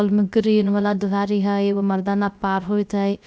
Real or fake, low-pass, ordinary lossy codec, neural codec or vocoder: fake; none; none; codec, 16 kHz, 0.3 kbps, FocalCodec